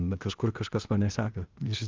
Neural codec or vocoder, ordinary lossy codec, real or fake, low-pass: codec, 16 kHz, 0.8 kbps, ZipCodec; Opus, 32 kbps; fake; 7.2 kHz